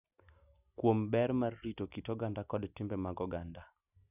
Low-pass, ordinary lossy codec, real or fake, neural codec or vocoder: 3.6 kHz; none; real; none